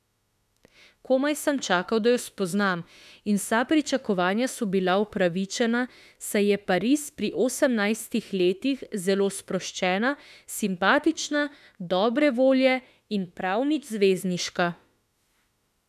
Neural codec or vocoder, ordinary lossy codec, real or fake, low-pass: autoencoder, 48 kHz, 32 numbers a frame, DAC-VAE, trained on Japanese speech; none; fake; 14.4 kHz